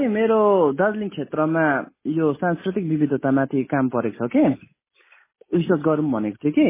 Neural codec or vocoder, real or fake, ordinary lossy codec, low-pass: none; real; MP3, 16 kbps; 3.6 kHz